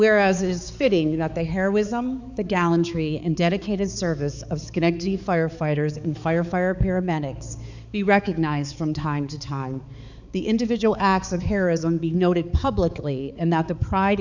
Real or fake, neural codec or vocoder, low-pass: fake; codec, 16 kHz, 4 kbps, X-Codec, HuBERT features, trained on balanced general audio; 7.2 kHz